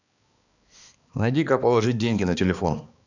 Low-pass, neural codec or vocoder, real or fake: 7.2 kHz; codec, 16 kHz, 2 kbps, X-Codec, HuBERT features, trained on balanced general audio; fake